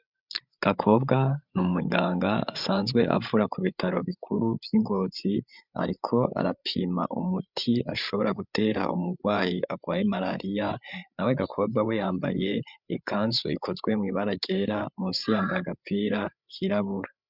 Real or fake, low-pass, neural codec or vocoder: fake; 5.4 kHz; codec, 16 kHz, 8 kbps, FreqCodec, larger model